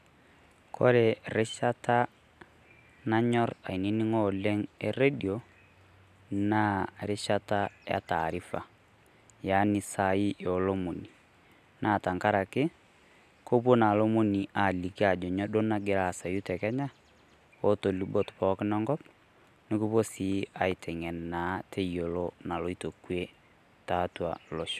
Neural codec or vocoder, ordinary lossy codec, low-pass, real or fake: none; none; 14.4 kHz; real